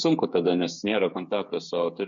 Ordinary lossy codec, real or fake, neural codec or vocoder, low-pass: MP3, 48 kbps; fake; codec, 16 kHz, 8 kbps, FreqCodec, smaller model; 7.2 kHz